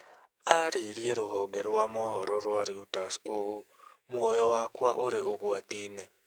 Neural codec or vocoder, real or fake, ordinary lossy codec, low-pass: codec, 44.1 kHz, 2.6 kbps, SNAC; fake; none; none